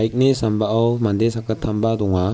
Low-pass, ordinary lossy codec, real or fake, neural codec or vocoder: none; none; real; none